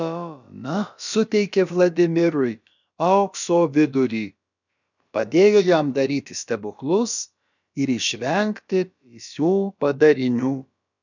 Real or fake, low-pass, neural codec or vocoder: fake; 7.2 kHz; codec, 16 kHz, about 1 kbps, DyCAST, with the encoder's durations